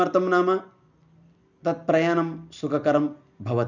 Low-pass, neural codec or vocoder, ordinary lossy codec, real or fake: 7.2 kHz; none; none; real